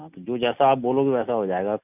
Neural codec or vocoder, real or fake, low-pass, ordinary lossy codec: none; real; 3.6 kHz; none